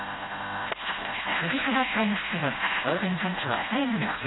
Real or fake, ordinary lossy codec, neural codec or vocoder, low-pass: fake; AAC, 16 kbps; codec, 16 kHz, 0.5 kbps, FreqCodec, smaller model; 7.2 kHz